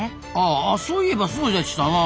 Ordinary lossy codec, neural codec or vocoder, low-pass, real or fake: none; none; none; real